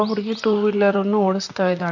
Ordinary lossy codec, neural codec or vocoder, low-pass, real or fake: none; vocoder, 44.1 kHz, 128 mel bands, Pupu-Vocoder; 7.2 kHz; fake